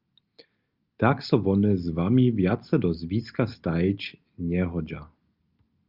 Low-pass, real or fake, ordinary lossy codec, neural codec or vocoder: 5.4 kHz; real; Opus, 24 kbps; none